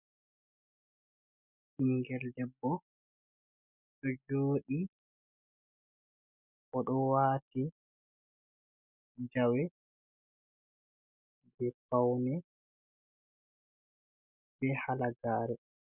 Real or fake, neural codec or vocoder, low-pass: real; none; 3.6 kHz